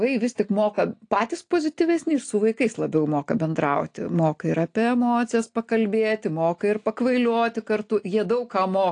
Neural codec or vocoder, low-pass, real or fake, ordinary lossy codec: none; 9.9 kHz; real; AAC, 48 kbps